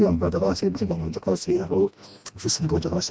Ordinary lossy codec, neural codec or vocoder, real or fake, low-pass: none; codec, 16 kHz, 1 kbps, FreqCodec, smaller model; fake; none